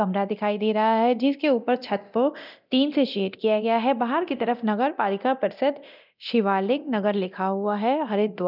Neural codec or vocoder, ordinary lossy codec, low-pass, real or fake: codec, 24 kHz, 0.9 kbps, DualCodec; none; 5.4 kHz; fake